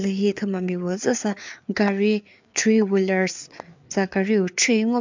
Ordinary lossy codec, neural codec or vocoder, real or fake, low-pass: MP3, 64 kbps; codec, 16 kHz, 4 kbps, FreqCodec, larger model; fake; 7.2 kHz